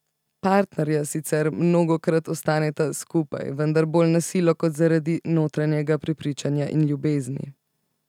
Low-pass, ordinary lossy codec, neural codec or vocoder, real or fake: 19.8 kHz; none; none; real